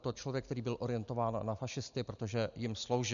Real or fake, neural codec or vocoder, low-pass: real; none; 7.2 kHz